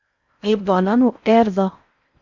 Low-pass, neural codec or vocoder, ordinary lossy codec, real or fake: 7.2 kHz; codec, 16 kHz in and 24 kHz out, 0.6 kbps, FocalCodec, streaming, 4096 codes; Opus, 64 kbps; fake